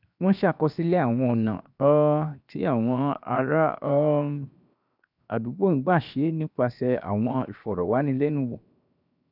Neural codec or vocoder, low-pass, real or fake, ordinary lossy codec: codec, 16 kHz, 0.7 kbps, FocalCodec; 5.4 kHz; fake; none